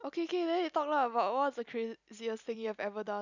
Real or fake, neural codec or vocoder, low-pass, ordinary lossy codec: real; none; 7.2 kHz; none